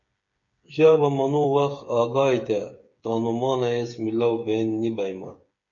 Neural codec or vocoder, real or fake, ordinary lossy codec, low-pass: codec, 16 kHz, 8 kbps, FreqCodec, smaller model; fake; MP3, 48 kbps; 7.2 kHz